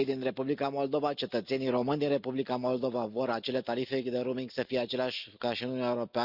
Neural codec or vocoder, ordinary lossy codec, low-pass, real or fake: none; Opus, 64 kbps; 5.4 kHz; real